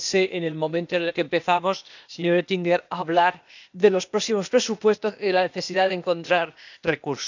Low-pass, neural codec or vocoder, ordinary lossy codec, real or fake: 7.2 kHz; codec, 16 kHz, 0.8 kbps, ZipCodec; none; fake